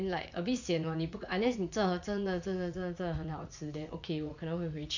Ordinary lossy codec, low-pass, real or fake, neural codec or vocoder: none; 7.2 kHz; fake; vocoder, 44.1 kHz, 80 mel bands, Vocos